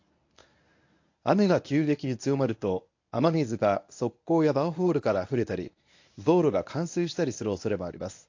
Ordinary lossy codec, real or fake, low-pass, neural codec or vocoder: AAC, 48 kbps; fake; 7.2 kHz; codec, 24 kHz, 0.9 kbps, WavTokenizer, medium speech release version 1